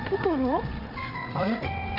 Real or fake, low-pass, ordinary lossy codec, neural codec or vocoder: fake; 5.4 kHz; none; codec, 16 kHz, 16 kbps, FreqCodec, larger model